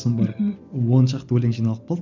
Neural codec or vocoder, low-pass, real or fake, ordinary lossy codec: none; 7.2 kHz; real; none